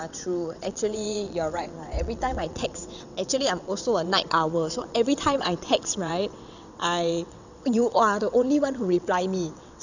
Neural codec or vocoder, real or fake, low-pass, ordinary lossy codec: vocoder, 22.05 kHz, 80 mel bands, WaveNeXt; fake; 7.2 kHz; none